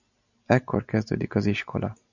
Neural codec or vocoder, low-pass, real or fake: none; 7.2 kHz; real